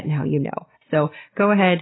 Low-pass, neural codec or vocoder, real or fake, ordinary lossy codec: 7.2 kHz; none; real; AAC, 16 kbps